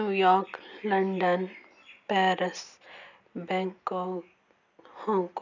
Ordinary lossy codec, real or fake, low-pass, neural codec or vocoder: none; real; 7.2 kHz; none